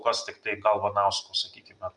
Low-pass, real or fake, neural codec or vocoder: 10.8 kHz; real; none